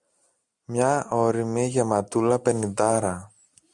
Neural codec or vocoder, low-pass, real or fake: none; 10.8 kHz; real